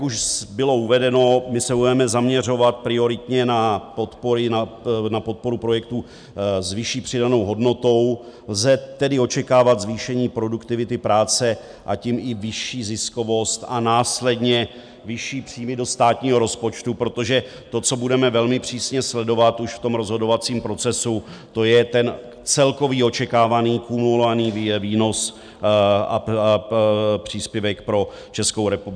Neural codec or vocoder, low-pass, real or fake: none; 9.9 kHz; real